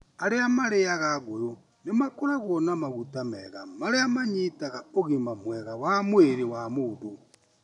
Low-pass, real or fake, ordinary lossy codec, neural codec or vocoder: 10.8 kHz; real; none; none